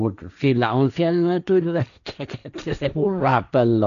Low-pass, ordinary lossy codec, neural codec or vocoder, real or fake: 7.2 kHz; none; codec, 16 kHz, 1.1 kbps, Voila-Tokenizer; fake